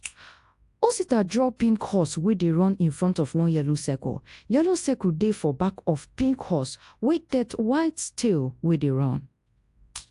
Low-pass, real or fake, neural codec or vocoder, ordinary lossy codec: 10.8 kHz; fake; codec, 24 kHz, 0.9 kbps, WavTokenizer, large speech release; AAC, 64 kbps